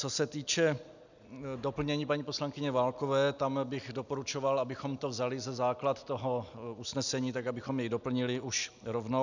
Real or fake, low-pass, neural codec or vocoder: real; 7.2 kHz; none